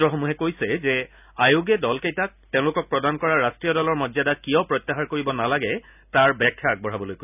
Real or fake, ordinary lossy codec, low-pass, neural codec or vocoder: real; none; 3.6 kHz; none